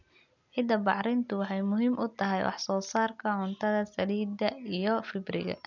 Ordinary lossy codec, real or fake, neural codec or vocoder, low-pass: none; real; none; 7.2 kHz